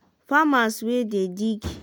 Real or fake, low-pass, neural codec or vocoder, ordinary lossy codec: real; none; none; none